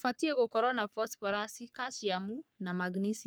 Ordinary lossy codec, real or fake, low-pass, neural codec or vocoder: none; fake; none; codec, 44.1 kHz, 3.4 kbps, Pupu-Codec